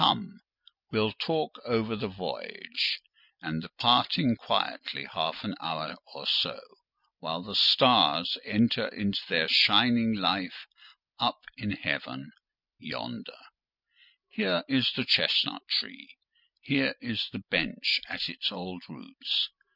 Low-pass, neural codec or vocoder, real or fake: 5.4 kHz; none; real